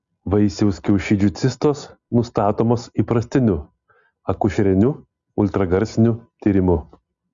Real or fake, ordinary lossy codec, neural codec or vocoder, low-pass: real; Opus, 64 kbps; none; 7.2 kHz